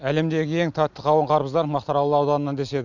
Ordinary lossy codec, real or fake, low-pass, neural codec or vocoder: none; real; 7.2 kHz; none